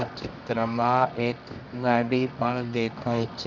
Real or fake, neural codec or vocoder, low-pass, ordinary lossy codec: fake; codec, 24 kHz, 0.9 kbps, WavTokenizer, medium music audio release; 7.2 kHz; none